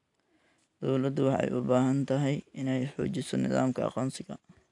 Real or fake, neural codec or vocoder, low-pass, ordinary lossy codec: real; none; 10.8 kHz; none